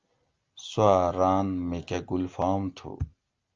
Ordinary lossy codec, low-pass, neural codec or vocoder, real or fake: Opus, 24 kbps; 7.2 kHz; none; real